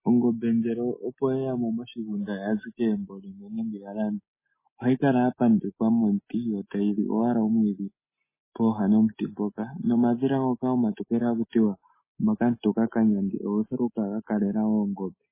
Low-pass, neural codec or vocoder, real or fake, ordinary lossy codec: 3.6 kHz; none; real; MP3, 16 kbps